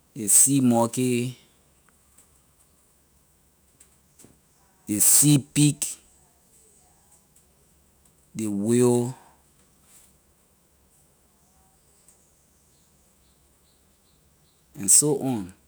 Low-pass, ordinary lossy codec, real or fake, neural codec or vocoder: none; none; fake; autoencoder, 48 kHz, 128 numbers a frame, DAC-VAE, trained on Japanese speech